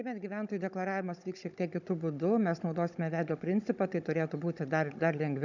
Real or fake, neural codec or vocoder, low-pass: fake; codec, 16 kHz, 16 kbps, FunCodec, trained on Chinese and English, 50 frames a second; 7.2 kHz